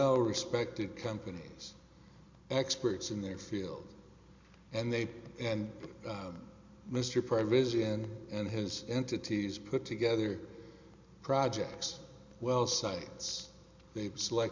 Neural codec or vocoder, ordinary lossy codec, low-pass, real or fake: none; MP3, 64 kbps; 7.2 kHz; real